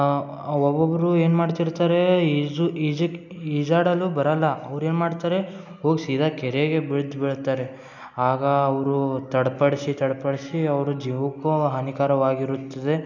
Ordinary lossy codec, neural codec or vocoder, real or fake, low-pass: none; none; real; 7.2 kHz